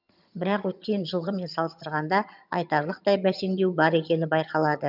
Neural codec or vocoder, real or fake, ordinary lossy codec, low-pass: vocoder, 22.05 kHz, 80 mel bands, HiFi-GAN; fake; none; 5.4 kHz